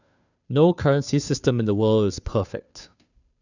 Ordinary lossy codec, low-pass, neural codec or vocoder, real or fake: none; 7.2 kHz; codec, 16 kHz, 2 kbps, FunCodec, trained on Chinese and English, 25 frames a second; fake